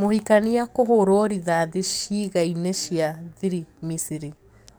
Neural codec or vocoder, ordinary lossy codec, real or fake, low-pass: codec, 44.1 kHz, 7.8 kbps, DAC; none; fake; none